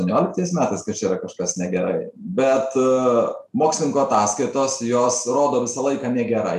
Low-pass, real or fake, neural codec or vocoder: 14.4 kHz; real; none